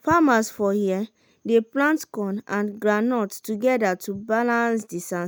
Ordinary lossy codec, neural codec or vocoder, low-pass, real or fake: none; none; none; real